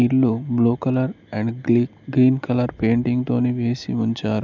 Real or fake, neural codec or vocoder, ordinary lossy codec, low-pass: real; none; none; 7.2 kHz